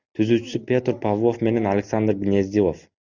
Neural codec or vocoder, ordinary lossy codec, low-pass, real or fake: none; Opus, 64 kbps; 7.2 kHz; real